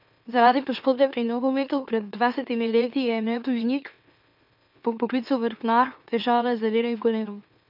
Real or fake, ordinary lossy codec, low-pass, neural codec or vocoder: fake; none; 5.4 kHz; autoencoder, 44.1 kHz, a latent of 192 numbers a frame, MeloTTS